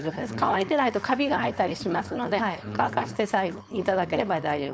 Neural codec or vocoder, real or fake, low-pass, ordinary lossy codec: codec, 16 kHz, 4.8 kbps, FACodec; fake; none; none